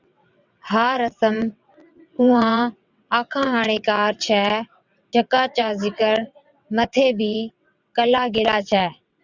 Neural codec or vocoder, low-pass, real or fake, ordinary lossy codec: vocoder, 22.05 kHz, 80 mel bands, WaveNeXt; 7.2 kHz; fake; Opus, 64 kbps